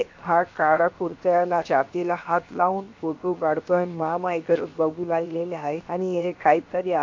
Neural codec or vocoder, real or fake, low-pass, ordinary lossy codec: codec, 16 kHz, 0.7 kbps, FocalCodec; fake; 7.2 kHz; MP3, 48 kbps